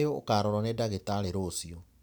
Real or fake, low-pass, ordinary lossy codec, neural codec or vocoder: real; none; none; none